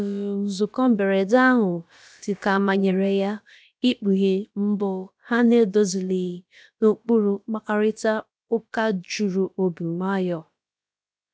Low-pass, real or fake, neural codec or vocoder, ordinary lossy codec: none; fake; codec, 16 kHz, about 1 kbps, DyCAST, with the encoder's durations; none